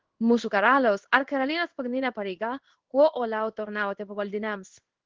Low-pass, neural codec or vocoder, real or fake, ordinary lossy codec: 7.2 kHz; codec, 16 kHz in and 24 kHz out, 1 kbps, XY-Tokenizer; fake; Opus, 16 kbps